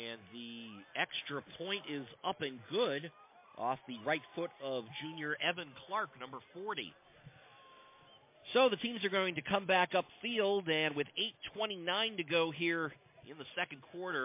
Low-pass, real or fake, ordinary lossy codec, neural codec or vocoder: 3.6 kHz; real; MP3, 24 kbps; none